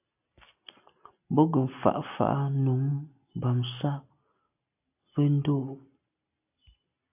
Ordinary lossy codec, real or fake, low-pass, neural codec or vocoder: AAC, 32 kbps; real; 3.6 kHz; none